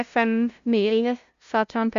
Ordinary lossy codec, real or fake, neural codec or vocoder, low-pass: Opus, 64 kbps; fake; codec, 16 kHz, 0.5 kbps, FunCodec, trained on LibriTTS, 25 frames a second; 7.2 kHz